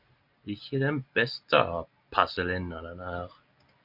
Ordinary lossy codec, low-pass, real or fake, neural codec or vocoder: MP3, 48 kbps; 5.4 kHz; real; none